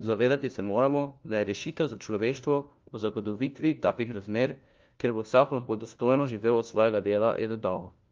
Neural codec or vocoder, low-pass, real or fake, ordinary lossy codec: codec, 16 kHz, 1 kbps, FunCodec, trained on LibriTTS, 50 frames a second; 7.2 kHz; fake; Opus, 32 kbps